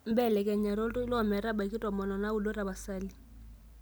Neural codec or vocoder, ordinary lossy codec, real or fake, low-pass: none; none; real; none